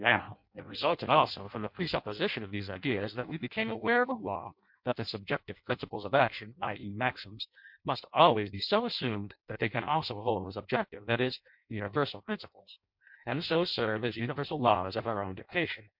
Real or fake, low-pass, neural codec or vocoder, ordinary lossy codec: fake; 5.4 kHz; codec, 16 kHz in and 24 kHz out, 0.6 kbps, FireRedTTS-2 codec; MP3, 48 kbps